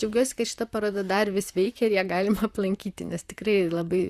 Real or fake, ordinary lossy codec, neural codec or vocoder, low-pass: fake; Opus, 64 kbps; vocoder, 44.1 kHz, 128 mel bands, Pupu-Vocoder; 14.4 kHz